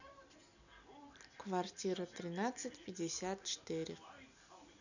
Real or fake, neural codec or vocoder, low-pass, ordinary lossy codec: real; none; 7.2 kHz; none